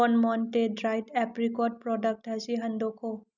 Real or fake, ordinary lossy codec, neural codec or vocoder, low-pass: real; none; none; 7.2 kHz